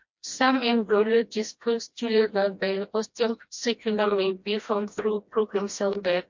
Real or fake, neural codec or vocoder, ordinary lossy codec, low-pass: fake; codec, 16 kHz, 1 kbps, FreqCodec, smaller model; MP3, 48 kbps; 7.2 kHz